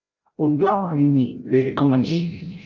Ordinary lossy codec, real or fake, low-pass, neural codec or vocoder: Opus, 32 kbps; fake; 7.2 kHz; codec, 16 kHz, 0.5 kbps, FreqCodec, larger model